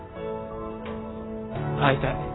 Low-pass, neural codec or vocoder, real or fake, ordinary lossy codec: 7.2 kHz; none; real; AAC, 16 kbps